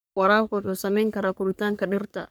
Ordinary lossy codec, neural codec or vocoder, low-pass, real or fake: none; codec, 44.1 kHz, 3.4 kbps, Pupu-Codec; none; fake